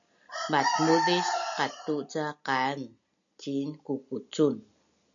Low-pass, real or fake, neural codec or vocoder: 7.2 kHz; real; none